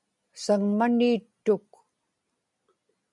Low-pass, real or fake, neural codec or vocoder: 10.8 kHz; real; none